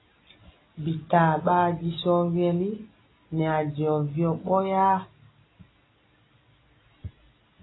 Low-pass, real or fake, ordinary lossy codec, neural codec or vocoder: 7.2 kHz; real; AAC, 16 kbps; none